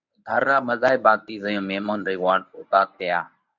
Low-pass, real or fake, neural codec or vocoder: 7.2 kHz; fake; codec, 24 kHz, 0.9 kbps, WavTokenizer, medium speech release version 1